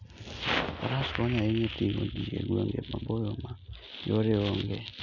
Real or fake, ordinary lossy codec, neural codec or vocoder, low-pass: real; none; none; 7.2 kHz